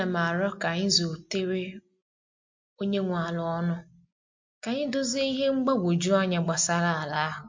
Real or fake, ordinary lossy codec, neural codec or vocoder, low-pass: real; MP3, 48 kbps; none; 7.2 kHz